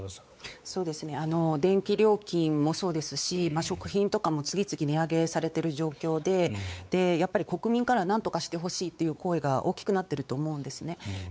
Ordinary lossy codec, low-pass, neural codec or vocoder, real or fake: none; none; codec, 16 kHz, 4 kbps, X-Codec, WavLM features, trained on Multilingual LibriSpeech; fake